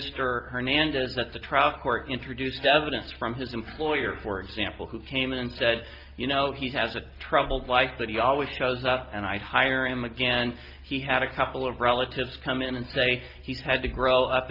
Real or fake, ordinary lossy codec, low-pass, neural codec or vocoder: real; Opus, 24 kbps; 5.4 kHz; none